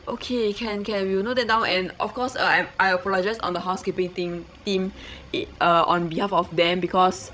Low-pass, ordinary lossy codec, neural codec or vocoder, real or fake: none; none; codec, 16 kHz, 16 kbps, FreqCodec, larger model; fake